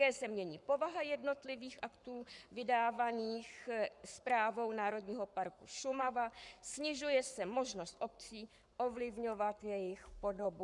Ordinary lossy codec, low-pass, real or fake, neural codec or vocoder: MP3, 96 kbps; 10.8 kHz; fake; codec, 44.1 kHz, 7.8 kbps, Pupu-Codec